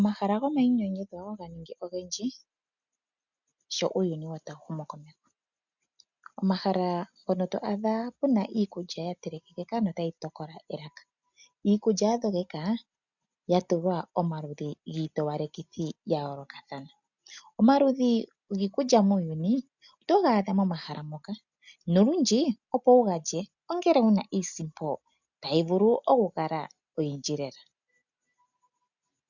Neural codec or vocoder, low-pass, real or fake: none; 7.2 kHz; real